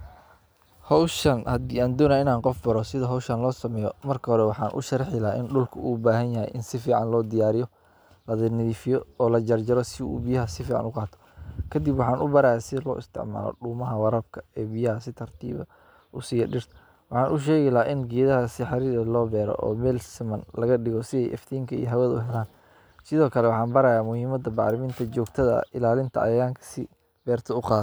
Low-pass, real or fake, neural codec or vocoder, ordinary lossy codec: none; real; none; none